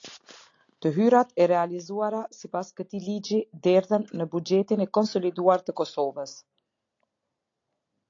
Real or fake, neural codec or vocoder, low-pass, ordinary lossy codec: real; none; 7.2 kHz; AAC, 48 kbps